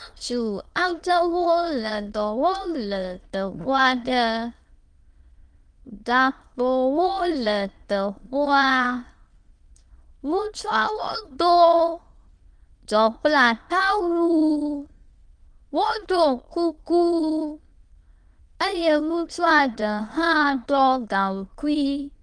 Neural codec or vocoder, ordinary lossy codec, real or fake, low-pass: autoencoder, 22.05 kHz, a latent of 192 numbers a frame, VITS, trained on many speakers; Opus, 24 kbps; fake; 9.9 kHz